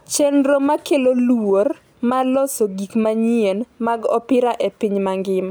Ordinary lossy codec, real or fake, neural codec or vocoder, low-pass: none; real; none; none